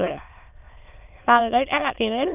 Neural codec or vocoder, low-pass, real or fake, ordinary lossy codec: autoencoder, 22.05 kHz, a latent of 192 numbers a frame, VITS, trained on many speakers; 3.6 kHz; fake; none